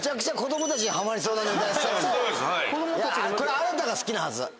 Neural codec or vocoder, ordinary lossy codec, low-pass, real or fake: none; none; none; real